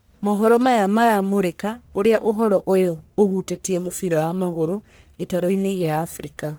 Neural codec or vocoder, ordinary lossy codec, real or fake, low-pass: codec, 44.1 kHz, 1.7 kbps, Pupu-Codec; none; fake; none